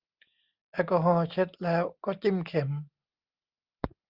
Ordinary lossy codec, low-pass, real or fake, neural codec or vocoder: Opus, 32 kbps; 5.4 kHz; real; none